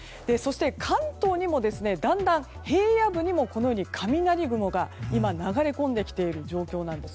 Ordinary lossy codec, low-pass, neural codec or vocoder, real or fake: none; none; none; real